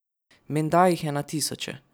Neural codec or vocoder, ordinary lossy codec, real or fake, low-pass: none; none; real; none